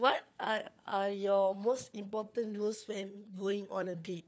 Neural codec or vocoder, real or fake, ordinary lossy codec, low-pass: codec, 16 kHz, 4 kbps, FunCodec, trained on LibriTTS, 50 frames a second; fake; none; none